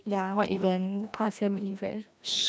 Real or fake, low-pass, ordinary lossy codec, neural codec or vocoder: fake; none; none; codec, 16 kHz, 1 kbps, FreqCodec, larger model